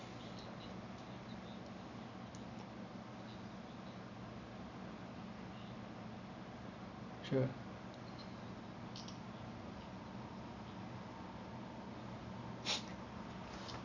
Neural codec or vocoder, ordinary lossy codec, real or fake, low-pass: none; none; real; 7.2 kHz